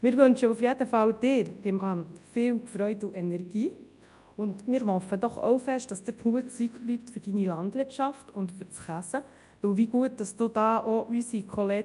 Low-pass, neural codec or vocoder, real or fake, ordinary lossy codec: 10.8 kHz; codec, 24 kHz, 0.9 kbps, WavTokenizer, large speech release; fake; none